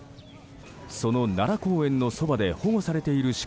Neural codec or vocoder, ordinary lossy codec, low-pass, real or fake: none; none; none; real